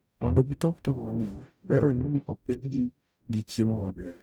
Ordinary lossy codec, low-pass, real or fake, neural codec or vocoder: none; none; fake; codec, 44.1 kHz, 0.9 kbps, DAC